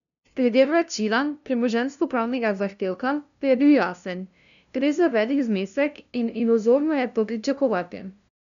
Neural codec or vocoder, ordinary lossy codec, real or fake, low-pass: codec, 16 kHz, 0.5 kbps, FunCodec, trained on LibriTTS, 25 frames a second; none; fake; 7.2 kHz